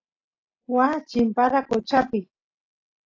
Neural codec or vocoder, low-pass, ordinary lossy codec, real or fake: none; 7.2 kHz; AAC, 32 kbps; real